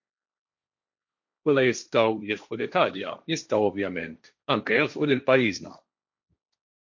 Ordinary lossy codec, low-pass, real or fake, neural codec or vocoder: MP3, 48 kbps; 7.2 kHz; fake; codec, 16 kHz, 1.1 kbps, Voila-Tokenizer